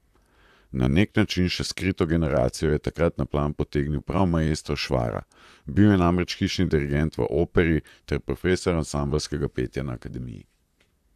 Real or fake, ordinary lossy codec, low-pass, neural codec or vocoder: fake; AAC, 96 kbps; 14.4 kHz; vocoder, 44.1 kHz, 128 mel bands, Pupu-Vocoder